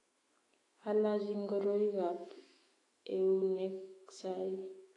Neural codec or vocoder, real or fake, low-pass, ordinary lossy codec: autoencoder, 48 kHz, 128 numbers a frame, DAC-VAE, trained on Japanese speech; fake; 10.8 kHz; AAC, 32 kbps